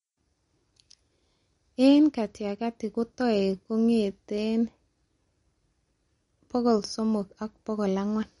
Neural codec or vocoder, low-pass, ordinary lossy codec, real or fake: none; 10.8 kHz; MP3, 48 kbps; real